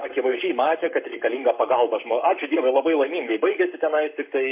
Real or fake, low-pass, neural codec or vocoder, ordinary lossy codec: fake; 3.6 kHz; codec, 44.1 kHz, 7.8 kbps, Pupu-Codec; MP3, 32 kbps